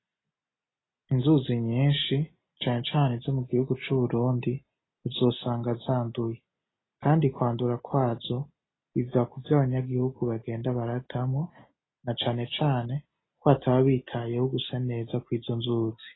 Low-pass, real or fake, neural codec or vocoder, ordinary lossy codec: 7.2 kHz; real; none; AAC, 16 kbps